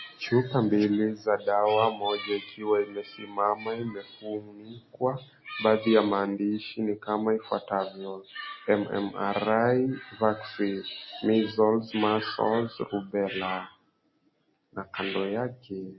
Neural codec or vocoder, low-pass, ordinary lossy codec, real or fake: none; 7.2 kHz; MP3, 24 kbps; real